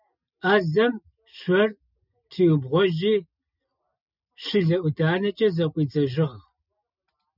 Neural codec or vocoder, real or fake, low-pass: none; real; 5.4 kHz